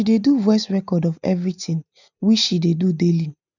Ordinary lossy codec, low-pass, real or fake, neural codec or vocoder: none; 7.2 kHz; real; none